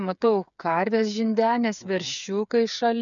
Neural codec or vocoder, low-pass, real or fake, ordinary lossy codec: codec, 16 kHz, 8 kbps, FreqCodec, smaller model; 7.2 kHz; fake; MP3, 96 kbps